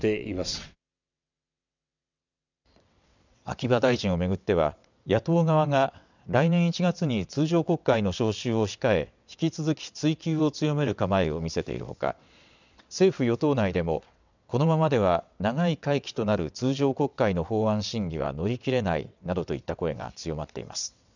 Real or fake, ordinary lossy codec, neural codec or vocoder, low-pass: fake; none; vocoder, 22.05 kHz, 80 mel bands, WaveNeXt; 7.2 kHz